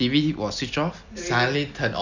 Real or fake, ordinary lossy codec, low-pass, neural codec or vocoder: real; none; 7.2 kHz; none